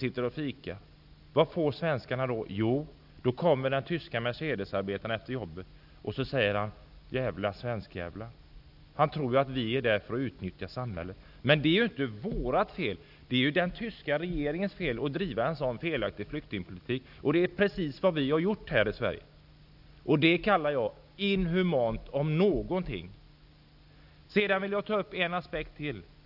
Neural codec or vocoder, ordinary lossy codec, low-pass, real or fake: none; none; 5.4 kHz; real